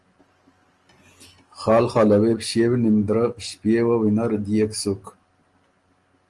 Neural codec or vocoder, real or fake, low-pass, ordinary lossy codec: none; real; 10.8 kHz; Opus, 24 kbps